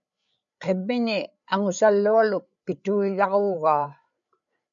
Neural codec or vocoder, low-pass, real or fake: codec, 16 kHz, 8 kbps, FreqCodec, larger model; 7.2 kHz; fake